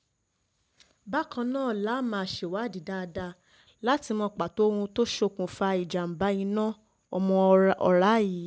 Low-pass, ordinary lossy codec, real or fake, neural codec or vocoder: none; none; real; none